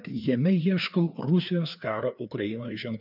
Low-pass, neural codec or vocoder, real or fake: 5.4 kHz; codec, 16 kHz, 2 kbps, FreqCodec, larger model; fake